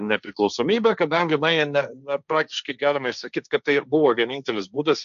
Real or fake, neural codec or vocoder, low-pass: fake; codec, 16 kHz, 1.1 kbps, Voila-Tokenizer; 7.2 kHz